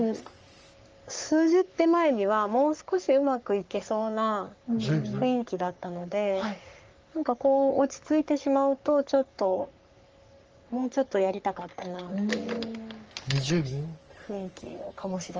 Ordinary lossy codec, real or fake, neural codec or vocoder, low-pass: Opus, 24 kbps; fake; codec, 44.1 kHz, 3.4 kbps, Pupu-Codec; 7.2 kHz